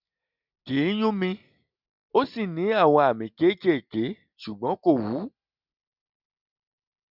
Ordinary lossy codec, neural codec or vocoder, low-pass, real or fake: none; none; 5.4 kHz; real